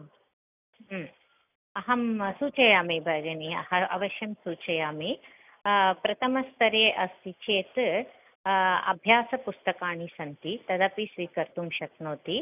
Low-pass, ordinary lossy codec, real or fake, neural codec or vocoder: 3.6 kHz; none; real; none